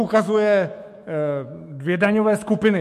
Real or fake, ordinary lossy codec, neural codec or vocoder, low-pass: fake; MP3, 64 kbps; autoencoder, 48 kHz, 128 numbers a frame, DAC-VAE, trained on Japanese speech; 14.4 kHz